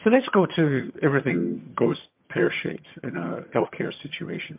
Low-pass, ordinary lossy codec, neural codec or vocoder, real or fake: 3.6 kHz; MP3, 32 kbps; vocoder, 22.05 kHz, 80 mel bands, HiFi-GAN; fake